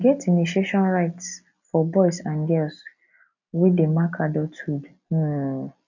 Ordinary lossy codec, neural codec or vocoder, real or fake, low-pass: none; none; real; 7.2 kHz